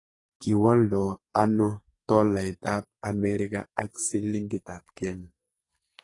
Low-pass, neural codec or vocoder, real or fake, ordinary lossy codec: 10.8 kHz; codec, 44.1 kHz, 2.6 kbps, SNAC; fake; AAC, 32 kbps